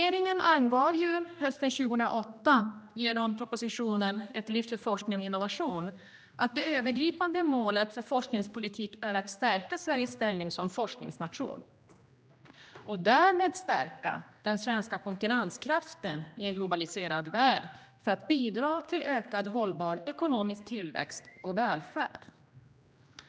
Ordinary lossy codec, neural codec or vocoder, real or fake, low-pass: none; codec, 16 kHz, 1 kbps, X-Codec, HuBERT features, trained on general audio; fake; none